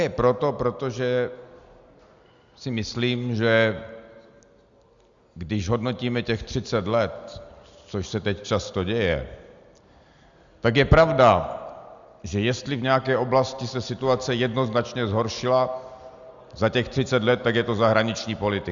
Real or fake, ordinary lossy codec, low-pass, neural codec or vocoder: real; Opus, 64 kbps; 7.2 kHz; none